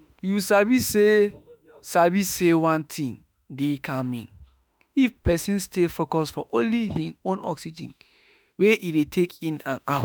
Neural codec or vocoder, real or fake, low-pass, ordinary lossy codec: autoencoder, 48 kHz, 32 numbers a frame, DAC-VAE, trained on Japanese speech; fake; none; none